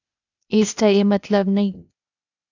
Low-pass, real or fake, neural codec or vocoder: 7.2 kHz; fake; codec, 16 kHz, 0.8 kbps, ZipCodec